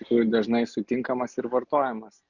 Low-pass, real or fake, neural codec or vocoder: 7.2 kHz; real; none